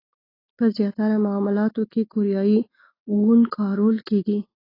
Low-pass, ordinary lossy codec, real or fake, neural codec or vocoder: 5.4 kHz; AAC, 24 kbps; real; none